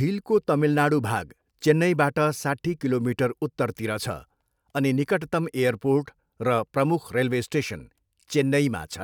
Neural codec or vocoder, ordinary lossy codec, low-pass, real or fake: none; none; 19.8 kHz; real